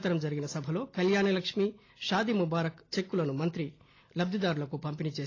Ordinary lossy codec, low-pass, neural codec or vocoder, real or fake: AAC, 32 kbps; 7.2 kHz; none; real